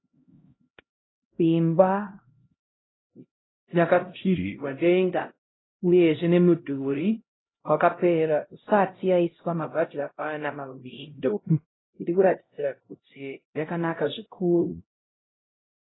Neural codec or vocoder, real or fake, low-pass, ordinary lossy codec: codec, 16 kHz, 0.5 kbps, X-Codec, HuBERT features, trained on LibriSpeech; fake; 7.2 kHz; AAC, 16 kbps